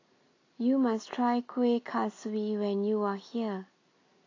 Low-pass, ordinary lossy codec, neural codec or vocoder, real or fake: 7.2 kHz; AAC, 32 kbps; none; real